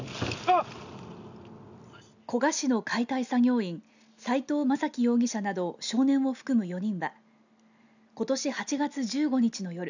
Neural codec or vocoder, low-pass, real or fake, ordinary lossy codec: none; 7.2 kHz; real; none